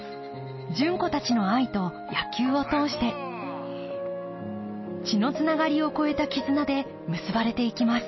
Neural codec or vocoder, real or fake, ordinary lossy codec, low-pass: none; real; MP3, 24 kbps; 7.2 kHz